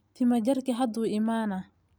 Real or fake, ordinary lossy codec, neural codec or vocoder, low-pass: real; none; none; none